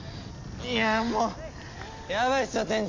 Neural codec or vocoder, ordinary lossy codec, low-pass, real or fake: none; AAC, 48 kbps; 7.2 kHz; real